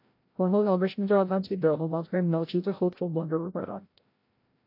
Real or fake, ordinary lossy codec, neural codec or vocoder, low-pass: fake; AAC, 32 kbps; codec, 16 kHz, 0.5 kbps, FreqCodec, larger model; 5.4 kHz